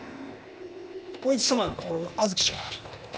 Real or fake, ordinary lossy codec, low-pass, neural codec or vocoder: fake; none; none; codec, 16 kHz, 0.8 kbps, ZipCodec